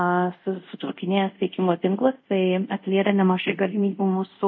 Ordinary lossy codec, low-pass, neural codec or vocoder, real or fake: MP3, 32 kbps; 7.2 kHz; codec, 24 kHz, 0.5 kbps, DualCodec; fake